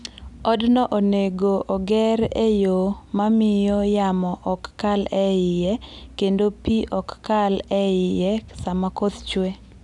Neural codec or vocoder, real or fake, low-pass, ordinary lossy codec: none; real; 10.8 kHz; none